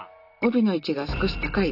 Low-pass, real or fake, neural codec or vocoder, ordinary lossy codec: 5.4 kHz; real; none; none